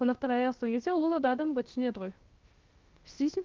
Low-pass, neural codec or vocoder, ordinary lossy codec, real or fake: 7.2 kHz; codec, 16 kHz, 0.7 kbps, FocalCodec; Opus, 24 kbps; fake